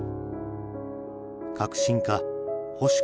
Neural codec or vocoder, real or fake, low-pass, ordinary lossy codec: none; real; none; none